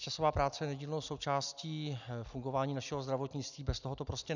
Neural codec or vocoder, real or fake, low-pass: none; real; 7.2 kHz